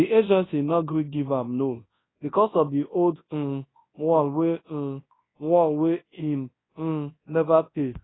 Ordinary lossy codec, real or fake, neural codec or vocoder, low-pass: AAC, 16 kbps; fake; codec, 24 kHz, 0.9 kbps, WavTokenizer, large speech release; 7.2 kHz